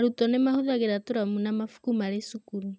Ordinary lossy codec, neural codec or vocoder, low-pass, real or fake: none; none; none; real